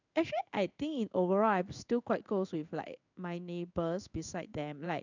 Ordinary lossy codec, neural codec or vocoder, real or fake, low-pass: none; codec, 16 kHz in and 24 kHz out, 1 kbps, XY-Tokenizer; fake; 7.2 kHz